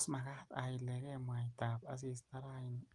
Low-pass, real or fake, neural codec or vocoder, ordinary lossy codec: 10.8 kHz; real; none; Opus, 32 kbps